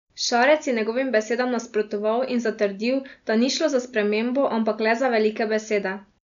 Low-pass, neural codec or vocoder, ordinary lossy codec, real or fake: 7.2 kHz; none; none; real